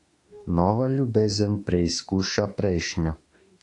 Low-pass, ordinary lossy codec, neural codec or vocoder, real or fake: 10.8 kHz; AAC, 48 kbps; autoencoder, 48 kHz, 32 numbers a frame, DAC-VAE, trained on Japanese speech; fake